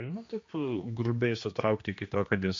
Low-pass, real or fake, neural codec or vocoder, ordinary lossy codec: 7.2 kHz; fake; codec, 16 kHz, 2 kbps, X-Codec, HuBERT features, trained on general audio; MP3, 48 kbps